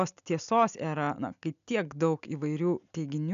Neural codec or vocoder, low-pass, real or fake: none; 7.2 kHz; real